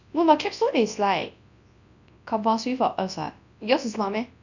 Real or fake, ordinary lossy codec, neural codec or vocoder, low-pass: fake; none; codec, 24 kHz, 0.9 kbps, WavTokenizer, large speech release; 7.2 kHz